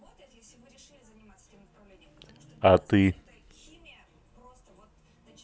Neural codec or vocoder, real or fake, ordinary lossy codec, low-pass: none; real; none; none